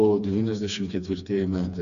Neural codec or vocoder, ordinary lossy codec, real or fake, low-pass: codec, 16 kHz, 2 kbps, FreqCodec, smaller model; AAC, 96 kbps; fake; 7.2 kHz